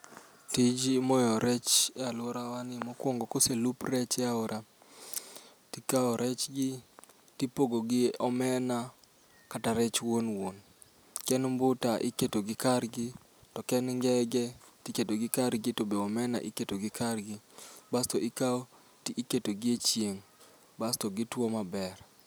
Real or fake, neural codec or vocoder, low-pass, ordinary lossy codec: real; none; none; none